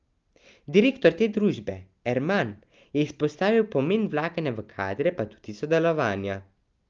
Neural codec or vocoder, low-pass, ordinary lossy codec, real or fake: none; 7.2 kHz; Opus, 32 kbps; real